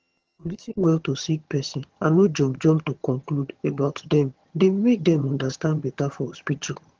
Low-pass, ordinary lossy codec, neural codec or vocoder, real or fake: 7.2 kHz; Opus, 16 kbps; vocoder, 22.05 kHz, 80 mel bands, HiFi-GAN; fake